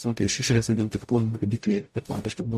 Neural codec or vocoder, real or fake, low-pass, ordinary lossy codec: codec, 44.1 kHz, 0.9 kbps, DAC; fake; 14.4 kHz; MP3, 64 kbps